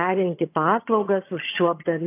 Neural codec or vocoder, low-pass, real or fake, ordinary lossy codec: vocoder, 22.05 kHz, 80 mel bands, HiFi-GAN; 3.6 kHz; fake; AAC, 24 kbps